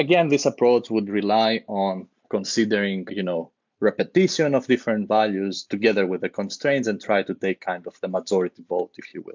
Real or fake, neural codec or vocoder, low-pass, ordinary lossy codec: real; none; 7.2 kHz; MP3, 64 kbps